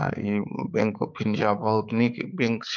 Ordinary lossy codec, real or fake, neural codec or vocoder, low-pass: none; fake; codec, 16 kHz, 4 kbps, X-Codec, HuBERT features, trained on balanced general audio; 7.2 kHz